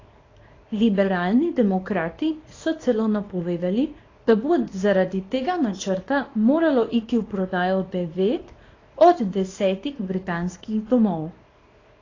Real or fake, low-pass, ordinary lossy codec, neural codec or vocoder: fake; 7.2 kHz; AAC, 32 kbps; codec, 24 kHz, 0.9 kbps, WavTokenizer, small release